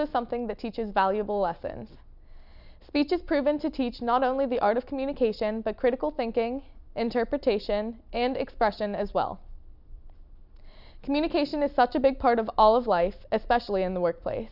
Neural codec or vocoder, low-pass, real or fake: none; 5.4 kHz; real